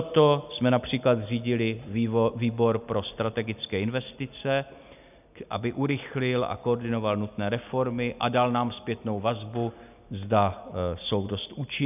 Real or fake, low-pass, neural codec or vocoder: real; 3.6 kHz; none